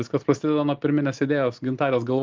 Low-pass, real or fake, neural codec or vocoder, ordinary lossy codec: 7.2 kHz; real; none; Opus, 24 kbps